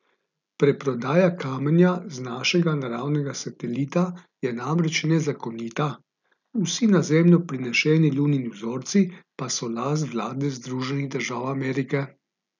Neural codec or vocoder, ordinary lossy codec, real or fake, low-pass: none; none; real; 7.2 kHz